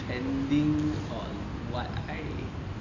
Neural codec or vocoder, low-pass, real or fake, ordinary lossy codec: none; 7.2 kHz; real; none